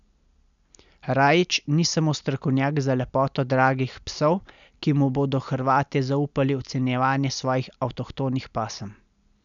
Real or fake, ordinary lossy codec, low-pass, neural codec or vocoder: real; none; 7.2 kHz; none